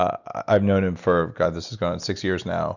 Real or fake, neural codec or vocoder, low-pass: real; none; 7.2 kHz